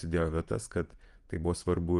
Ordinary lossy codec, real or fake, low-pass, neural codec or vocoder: Opus, 32 kbps; fake; 10.8 kHz; vocoder, 24 kHz, 100 mel bands, Vocos